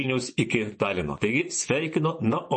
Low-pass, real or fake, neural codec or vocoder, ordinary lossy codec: 10.8 kHz; fake; vocoder, 24 kHz, 100 mel bands, Vocos; MP3, 32 kbps